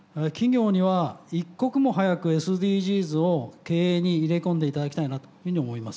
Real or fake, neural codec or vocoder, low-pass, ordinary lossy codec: real; none; none; none